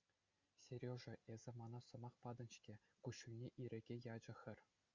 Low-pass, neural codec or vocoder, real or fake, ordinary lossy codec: 7.2 kHz; none; real; Opus, 64 kbps